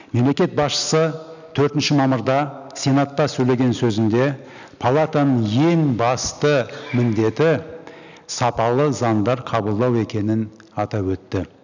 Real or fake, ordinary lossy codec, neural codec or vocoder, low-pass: real; none; none; 7.2 kHz